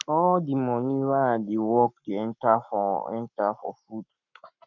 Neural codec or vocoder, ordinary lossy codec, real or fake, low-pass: codec, 44.1 kHz, 7.8 kbps, Pupu-Codec; none; fake; 7.2 kHz